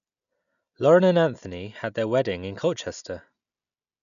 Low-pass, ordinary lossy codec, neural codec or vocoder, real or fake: 7.2 kHz; none; none; real